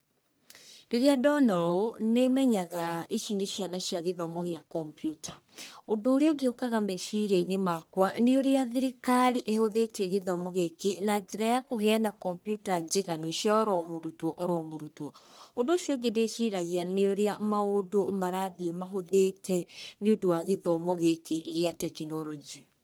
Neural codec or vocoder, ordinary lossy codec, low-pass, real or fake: codec, 44.1 kHz, 1.7 kbps, Pupu-Codec; none; none; fake